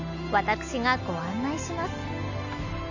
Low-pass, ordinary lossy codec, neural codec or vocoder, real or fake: 7.2 kHz; AAC, 48 kbps; none; real